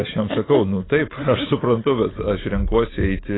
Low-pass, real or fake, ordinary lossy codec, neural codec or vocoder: 7.2 kHz; real; AAC, 16 kbps; none